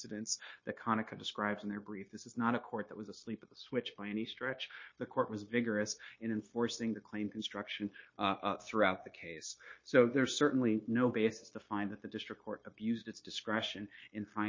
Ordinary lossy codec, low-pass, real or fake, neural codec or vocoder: MP3, 32 kbps; 7.2 kHz; fake; codec, 16 kHz, 0.9 kbps, LongCat-Audio-Codec